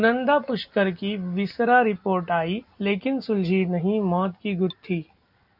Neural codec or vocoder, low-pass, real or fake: none; 5.4 kHz; real